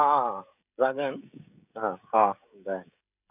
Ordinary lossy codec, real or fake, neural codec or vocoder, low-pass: none; fake; codec, 16 kHz, 16 kbps, FreqCodec, smaller model; 3.6 kHz